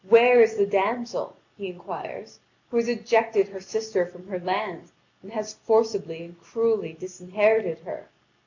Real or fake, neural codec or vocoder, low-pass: real; none; 7.2 kHz